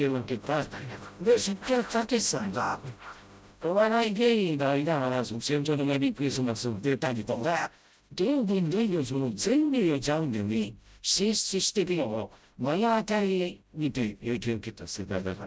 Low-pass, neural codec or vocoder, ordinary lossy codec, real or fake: none; codec, 16 kHz, 0.5 kbps, FreqCodec, smaller model; none; fake